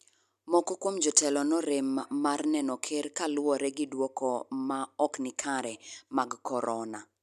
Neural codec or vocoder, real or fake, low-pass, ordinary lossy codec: none; real; 14.4 kHz; none